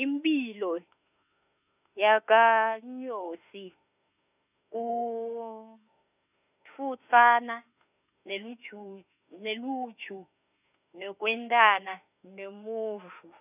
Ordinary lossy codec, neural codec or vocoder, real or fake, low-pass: none; autoencoder, 48 kHz, 32 numbers a frame, DAC-VAE, trained on Japanese speech; fake; 3.6 kHz